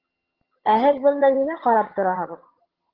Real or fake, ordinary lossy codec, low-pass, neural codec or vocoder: fake; Opus, 16 kbps; 5.4 kHz; vocoder, 22.05 kHz, 80 mel bands, HiFi-GAN